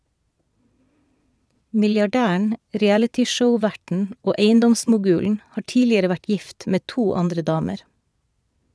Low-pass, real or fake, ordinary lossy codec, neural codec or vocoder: none; fake; none; vocoder, 22.05 kHz, 80 mel bands, Vocos